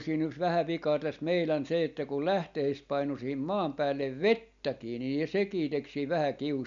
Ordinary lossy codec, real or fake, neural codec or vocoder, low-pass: none; real; none; 7.2 kHz